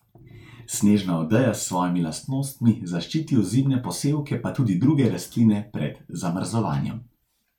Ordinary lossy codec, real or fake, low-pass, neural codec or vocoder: none; real; 19.8 kHz; none